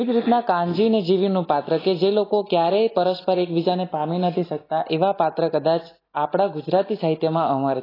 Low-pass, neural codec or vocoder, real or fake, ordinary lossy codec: 5.4 kHz; none; real; AAC, 24 kbps